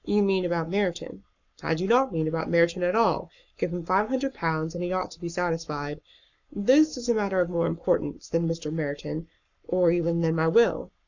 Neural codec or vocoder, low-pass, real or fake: codec, 44.1 kHz, 7.8 kbps, Pupu-Codec; 7.2 kHz; fake